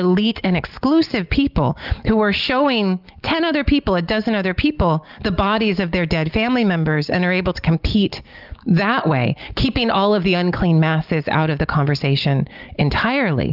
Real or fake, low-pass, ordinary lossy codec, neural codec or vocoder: real; 5.4 kHz; Opus, 32 kbps; none